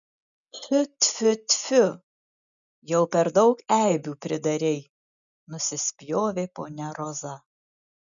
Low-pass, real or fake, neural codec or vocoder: 7.2 kHz; real; none